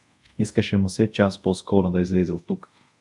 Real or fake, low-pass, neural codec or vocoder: fake; 10.8 kHz; codec, 24 kHz, 0.5 kbps, DualCodec